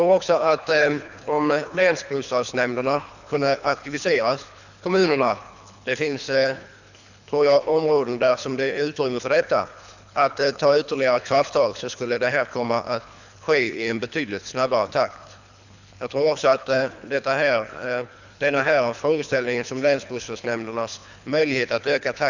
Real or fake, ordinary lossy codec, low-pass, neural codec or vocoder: fake; none; 7.2 kHz; codec, 24 kHz, 3 kbps, HILCodec